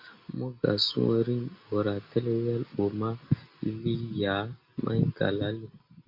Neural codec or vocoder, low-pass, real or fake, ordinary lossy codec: none; 5.4 kHz; real; MP3, 48 kbps